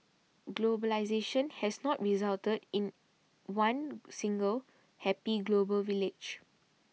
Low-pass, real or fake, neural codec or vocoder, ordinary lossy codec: none; real; none; none